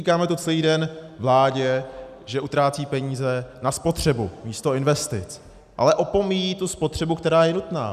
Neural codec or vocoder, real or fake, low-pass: none; real; 14.4 kHz